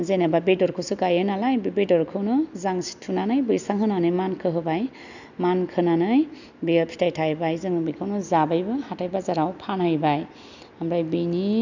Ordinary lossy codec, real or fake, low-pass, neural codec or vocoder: none; real; 7.2 kHz; none